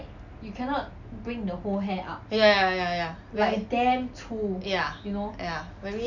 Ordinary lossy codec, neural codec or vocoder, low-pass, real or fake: none; none; 7.2 kHz; real